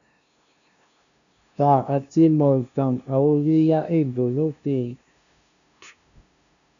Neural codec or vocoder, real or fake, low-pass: codec, 16 kHz, 0.5 kbps, FunCodec, trained on LibriTTS, 25 frames a second; fake; 7.2 kHz